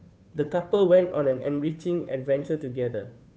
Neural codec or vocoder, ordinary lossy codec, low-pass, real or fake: codec, 16 kHz, 2 kbps, FunCodec, trained on Chinese and English, 25 frames a second; none; none; fake